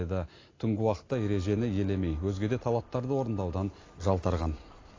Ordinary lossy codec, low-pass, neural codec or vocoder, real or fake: AAC, 32 kbps; 7.2 kHz; none; real